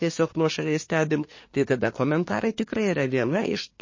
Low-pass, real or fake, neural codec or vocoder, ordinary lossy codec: 7.2 kHz; fake; codec, 24 kHz, 1 kbps, SNAC; MP3, 32 kbps